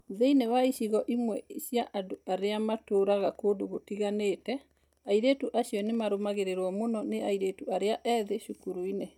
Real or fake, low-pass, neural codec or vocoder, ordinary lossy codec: real; 19.8 kHz; none; none